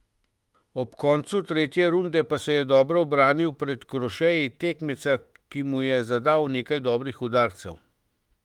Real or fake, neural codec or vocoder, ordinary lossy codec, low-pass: fake; autoencoder, 48 kHz, 32 numbers a frame, DAC-VAE, trained on Japanese speech; Opus, 32 kbps; 19.8 kHz